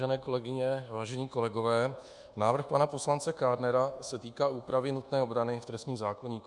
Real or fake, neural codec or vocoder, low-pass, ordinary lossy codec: fake; codec, 24 kHz, 1.2 kbps, DualCodec; 10.8 kHz; Opus, 64 kbps